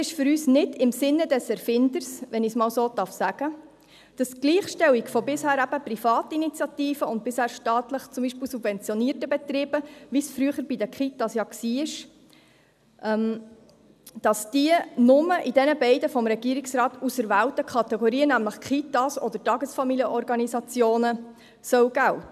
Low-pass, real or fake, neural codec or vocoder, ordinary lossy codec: 14.4 kHz; real; none; none